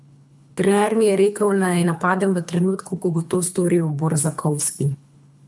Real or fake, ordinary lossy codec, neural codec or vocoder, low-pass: fake; none; codec, 24 kHz, 3 kbps, HILCodec; none